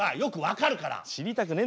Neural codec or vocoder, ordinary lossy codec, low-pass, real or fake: none; none; none; real